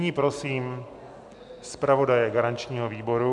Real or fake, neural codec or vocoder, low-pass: real; none; 10.8 kHz